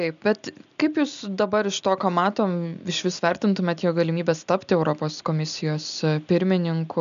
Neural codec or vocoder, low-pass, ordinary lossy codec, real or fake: none; 7.2 kHz; MP3, 96 kbps; real